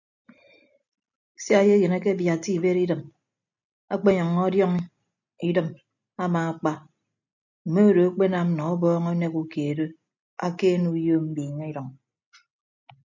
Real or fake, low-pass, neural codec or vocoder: real; 7.2 kHz; none